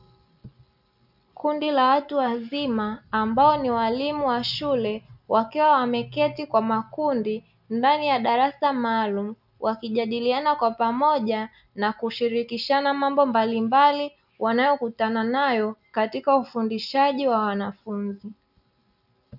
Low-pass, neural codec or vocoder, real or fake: 5.4 kHz; none; real